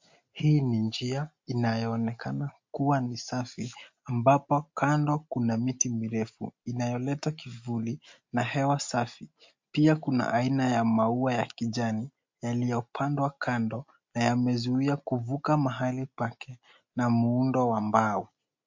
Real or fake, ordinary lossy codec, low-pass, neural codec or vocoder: real; MP3, 48 kbps; 7.2 kHz; none